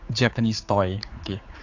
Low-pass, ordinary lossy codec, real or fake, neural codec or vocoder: 7.2 kHz; AAC, 48 kbps; fake; codec, 16 kHz, 4 kbps, X-Codec, HuBERT features, trained on general audio